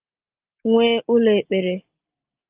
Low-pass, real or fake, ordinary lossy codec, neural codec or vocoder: 3.6 kHz; real; Opus, 32 kbps; none